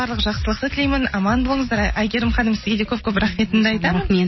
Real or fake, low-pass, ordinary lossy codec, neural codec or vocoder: real; 7.2 kHz; MP3, 24 kbps; none